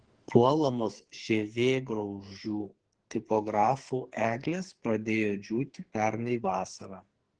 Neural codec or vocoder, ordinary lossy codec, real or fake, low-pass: codec, 44.1 kHz, 2.6 kbps, SNAC; Opus, 16 kbps; fake; 9.9 kHz